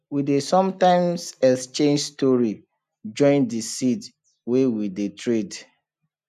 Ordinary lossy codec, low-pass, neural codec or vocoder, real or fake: none; 14.4 kHz; none; real